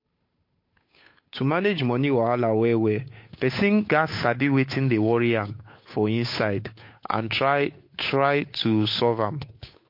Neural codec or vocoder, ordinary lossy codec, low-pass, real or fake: codec, 16 kHz, 8 kbps, FunCodec, trained on Chinese and English, 25 frames a second; MP3, 32 kbps; 5.4 kHz; fake